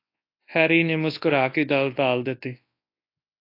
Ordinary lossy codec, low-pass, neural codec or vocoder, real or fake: AAC, 32 kbps; 5.4 kHz; codec, 24 kHz, 0.9 kbps, WavTokenizer, large speech release; fake